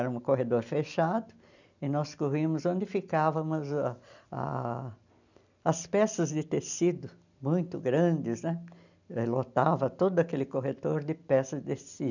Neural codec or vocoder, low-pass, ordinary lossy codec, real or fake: none; 7.2 kHz; none; real